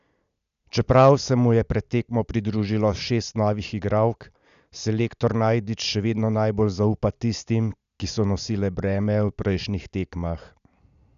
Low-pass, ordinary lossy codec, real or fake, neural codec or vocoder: 7.2 kHz; none; real; none